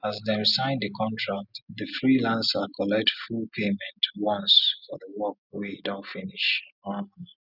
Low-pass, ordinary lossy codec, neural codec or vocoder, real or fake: 5.4 kHz; none; none; real